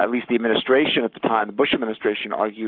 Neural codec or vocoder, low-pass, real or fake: codec, 44.1 kHz, 7.8 kbps, Pupu-Codec; 5.4 kHz; fake